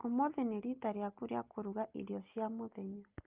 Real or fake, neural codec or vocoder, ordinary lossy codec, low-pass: real; none; Opus, 24 kbps; 3.6 kHz